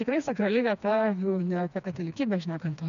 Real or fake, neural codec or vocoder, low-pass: fake; codec, 16 kHz, 2 kbps, FreqCodec, smaller model; 7.2 kHz